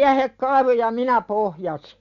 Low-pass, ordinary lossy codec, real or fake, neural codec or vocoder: 7.2 kHz; none; real; none